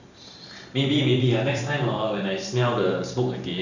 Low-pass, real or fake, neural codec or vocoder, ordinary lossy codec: 7.2 kHz; fake; vocoder, 44.1 kHz, 128 mel bands every 512 samples, BigVGAN v2; none